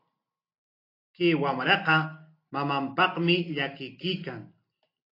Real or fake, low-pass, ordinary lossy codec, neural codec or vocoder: real; 5.4 kHz; AAC, 32 kbps; none